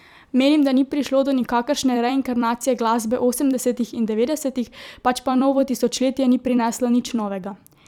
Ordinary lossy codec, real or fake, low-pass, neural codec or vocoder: none; fake; 19.8 kHz; vocoder, 44.1 kHz, 128 mel bands every 256 samples, BigVGAN v2